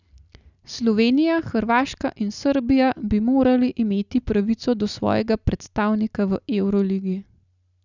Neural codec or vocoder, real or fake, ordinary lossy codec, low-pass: none; real; none; 7.2 kHz